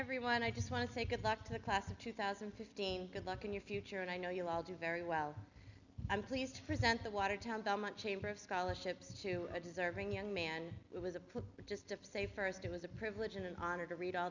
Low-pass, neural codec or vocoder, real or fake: 7.2 kHz; none; real